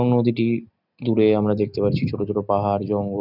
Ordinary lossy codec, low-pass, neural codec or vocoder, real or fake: none; 5.4 kHz; none; real